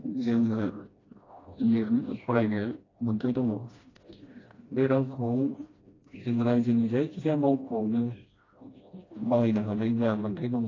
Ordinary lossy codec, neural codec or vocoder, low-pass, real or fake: AAC, 32 kbps; codec, 16 kHz, 1 kbps, FreqCodec, smaller model; 7.2 kHz; fake